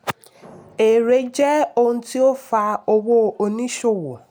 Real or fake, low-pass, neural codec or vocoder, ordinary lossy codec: real; none; none; none